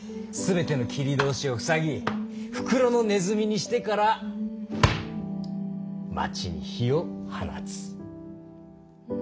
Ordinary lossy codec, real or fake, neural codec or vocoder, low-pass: none; real; none; none